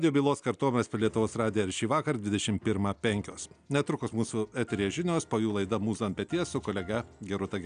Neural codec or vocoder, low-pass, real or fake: none; 9.9 kHz; real